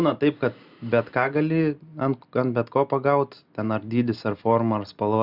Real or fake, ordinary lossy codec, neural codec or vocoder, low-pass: real; Opus, 64 kbps; none; 5.4 kHz